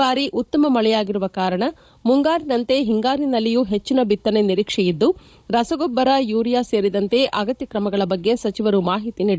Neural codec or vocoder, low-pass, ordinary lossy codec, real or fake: codec, 16 kHz, 16 kbps, FunCodec, trained on Chinese and English, 50 frames a second; none; none; fake